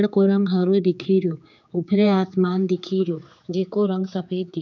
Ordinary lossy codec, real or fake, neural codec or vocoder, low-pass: none; fake; codec, 16 kHz, 4 kbps, X-Codec, HuBERT features, trained on general audio; 7.2 kHz